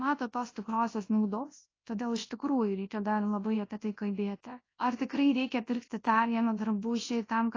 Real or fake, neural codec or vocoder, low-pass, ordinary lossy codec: fake; codec, 24 kHz, 0.9 kbps, WavTokenizer, large speech release; 7.2 kHz; AAC, 32 kbps